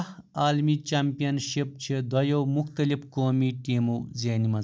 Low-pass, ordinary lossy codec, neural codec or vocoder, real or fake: none; none; none; real